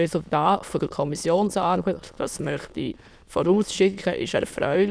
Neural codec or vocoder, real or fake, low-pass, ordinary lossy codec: autoencoder, 22.05 kHz, a latent of 192 numbers a frame, VITS, trained on many speakers; fake; none; none